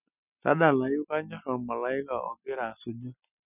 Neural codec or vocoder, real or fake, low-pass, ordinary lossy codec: none; real; 3.6 kHz; none